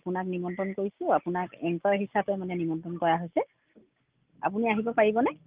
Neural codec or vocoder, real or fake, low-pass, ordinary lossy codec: none; real; 3.6 kHz; Opus, 32 kbps